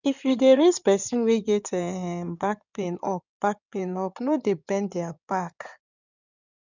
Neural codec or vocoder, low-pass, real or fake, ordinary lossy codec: codec, 16 kHz in and 24 kHz out, 2.2 kbps, FireRedTTS-2 codec; 7.2 kHz; fake; none